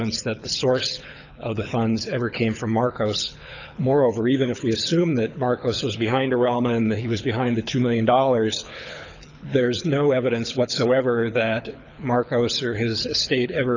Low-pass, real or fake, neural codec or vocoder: 7.2 kHz; fake; codec, 24 kHz, 6 kbps, HILCodec